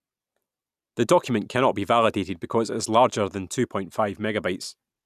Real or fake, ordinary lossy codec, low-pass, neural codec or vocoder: real; none; 14.4 kHz; none